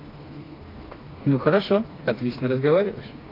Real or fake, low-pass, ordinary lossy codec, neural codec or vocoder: fake; 5.4 kHz; AAC, 24 kbps; codec, 16 kHz, 2 kbps, FreqCodec, smaller model